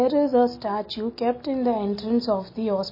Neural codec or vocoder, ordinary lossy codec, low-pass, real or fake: none; MP3, 24 kbps; 5.4 kHz; real